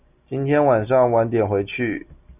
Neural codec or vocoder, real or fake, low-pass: none; real; 3.6 kHz